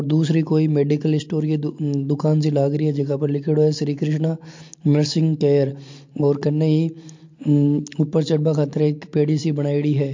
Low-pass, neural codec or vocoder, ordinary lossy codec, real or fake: 7.2 kHz; none; MP3, 48 kbps; real